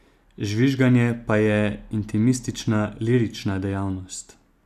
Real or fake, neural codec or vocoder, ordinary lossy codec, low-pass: real; none; none; 14.4 kHz